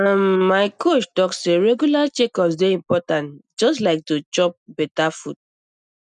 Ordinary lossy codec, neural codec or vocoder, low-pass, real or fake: none; none; 9.9 kHz; real